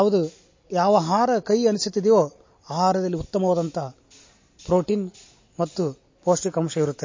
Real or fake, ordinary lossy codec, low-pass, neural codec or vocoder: real; MP3, 32 kbps; 7.2 kHz; none